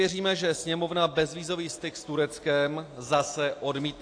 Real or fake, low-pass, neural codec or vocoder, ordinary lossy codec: real; 9.9 kHz; none; AAC, 48 kbps